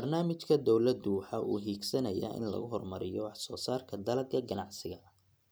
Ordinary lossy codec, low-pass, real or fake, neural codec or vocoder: none; none; real; none